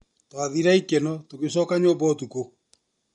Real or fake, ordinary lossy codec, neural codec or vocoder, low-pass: real; MP3, 48 kbps; none; 10.8 kHz